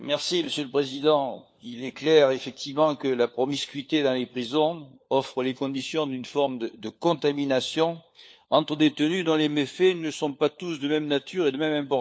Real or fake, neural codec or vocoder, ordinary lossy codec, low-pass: fake; codec, 16 kHz, 4 kbps, FunCodec, trained on LibriTTS, 50 frames a second; none; none